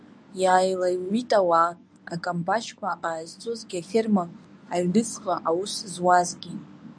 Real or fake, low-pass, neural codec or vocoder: fake; 9.9 kHz; codec, 24 kHz, 0.9 kbps, WavTokenizer, medium speech release version 1